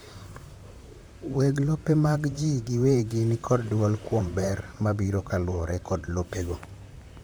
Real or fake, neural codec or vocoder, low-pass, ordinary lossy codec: fake; vocoder, 44.1 kHz, 128 mel bands, Pupu-Vocoder; none; none